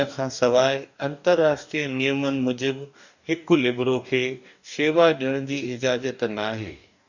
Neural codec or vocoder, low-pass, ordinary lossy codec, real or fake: codec, 44.1 kHz, 2.6 kbps, DAC; 7.2 kHz; none; fake